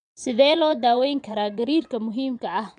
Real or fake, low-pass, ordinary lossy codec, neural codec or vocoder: fake; 9.9 kHz; none; vocoder, 22.05 kHz, 80 mel bands, Vocos